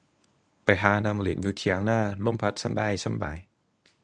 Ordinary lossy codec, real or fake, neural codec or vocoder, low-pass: none; fake; codec, 24 kHz, 0.9 kbps, WavTokenizer, medium speech release version 1; 10.8 kHz